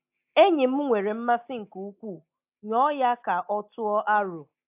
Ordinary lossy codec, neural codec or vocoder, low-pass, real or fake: AAC, 32 kbps; none; 3.6 kHz; real